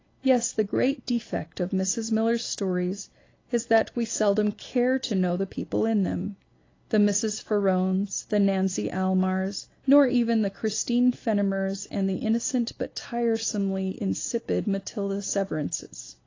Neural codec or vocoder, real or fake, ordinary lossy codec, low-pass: none; real; AAC, 32 kbps; 7.2 kHz